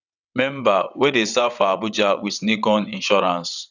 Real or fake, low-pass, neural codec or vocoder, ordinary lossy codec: fake; 7.2 kHz; vocoder, 22.05 kHz, 80 mel bands, WaveNeXt; none